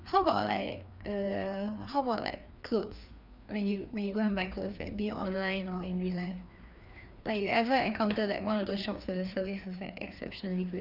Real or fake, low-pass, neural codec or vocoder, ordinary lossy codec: fake; 5.4 kHz; codec, 16 kHz, 2 kbps, FreqCodec, larger model; none